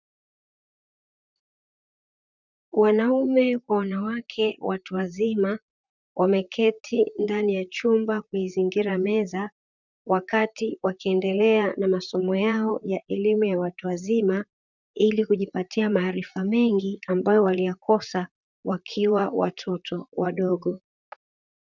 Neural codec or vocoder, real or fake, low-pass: vocoder, 44.1 kHz, 128 mel bands, Pupu-Vocoder; fake; 7.2 kHz